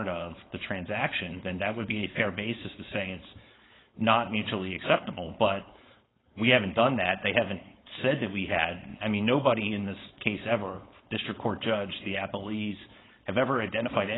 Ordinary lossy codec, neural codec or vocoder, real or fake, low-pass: AAC, 16 kbps; codec, 16 kHz, 4.8 kbps, FACodec; fake; 7.2 kHz